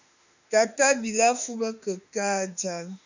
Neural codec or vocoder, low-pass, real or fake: autoencoder, 48 kHz, 32 numbers a frame, DAC-VAE, trained on Japanese speech; 7.2 kHz; fake